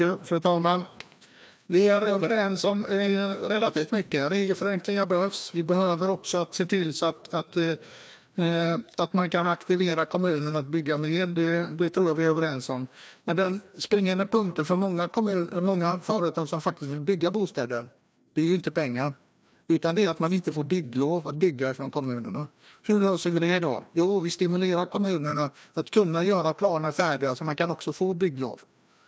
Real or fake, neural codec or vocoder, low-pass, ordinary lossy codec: fake; codec, 16 kHz, 1 kbps, FreqCodec, larger model; none; none